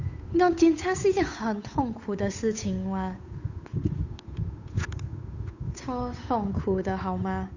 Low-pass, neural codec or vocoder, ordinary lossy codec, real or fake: 7.2 kHz; codec, 16 kHz, 8 kbps, FunCodec, trained on Chinese and English, 25 frames a second; none; fake